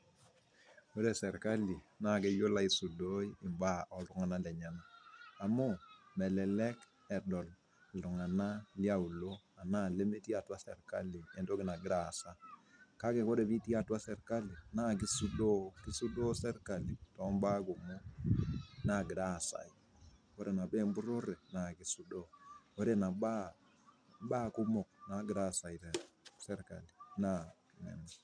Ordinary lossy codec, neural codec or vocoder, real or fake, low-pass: none; none; real; 9.9 kHz